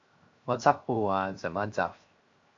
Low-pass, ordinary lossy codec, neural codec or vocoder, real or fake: 7.2 kHz; AAC, 48 kbps; codec, 16 kHz, 0.7 kbps, FocalCodec; fake